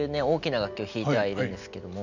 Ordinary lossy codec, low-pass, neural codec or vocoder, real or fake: none; 7.2 kHz; none; real